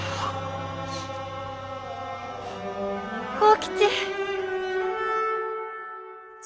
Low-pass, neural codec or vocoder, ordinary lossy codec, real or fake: none; none; none; real